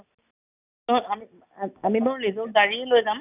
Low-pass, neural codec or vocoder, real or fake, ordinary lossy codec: 3.6 kHz; none; real; none